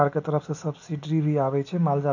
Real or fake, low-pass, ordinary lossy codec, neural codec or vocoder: real; 7.2 kHz; none; none